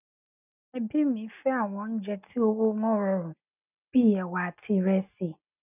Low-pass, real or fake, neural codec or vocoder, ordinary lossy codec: 3.6 kHz; real; none; none